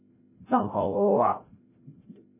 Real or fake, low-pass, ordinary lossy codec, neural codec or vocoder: fake; 3.6 kHz; MP3, 16 kbps; codec, 16 kHz, 0.5 kbps, FreqCodec, larger model